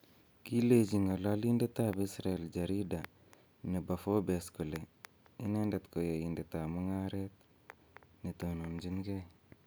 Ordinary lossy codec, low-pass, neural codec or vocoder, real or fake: none; none; none; real